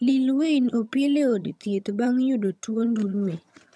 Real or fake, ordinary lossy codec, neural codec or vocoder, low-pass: fake; none; vocoder, 22.05 kHz, 80 mel bands, HiFi-GAN; none